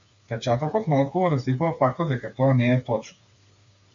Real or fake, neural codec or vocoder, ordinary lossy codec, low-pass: fake; codec, 16 kHz, 4 kbps, FreqCodec, smaller model; AAC, 64 kbps; 7.2 kHz